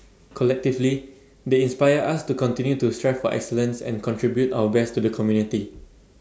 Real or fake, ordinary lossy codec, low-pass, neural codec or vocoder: real; none; none; none